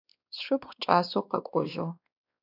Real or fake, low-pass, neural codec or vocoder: fake; 5.4 kHz; codec, 16 kHz, 2 kbps, X-Codec, WavLM features, trained on Multilingual LibriSpeech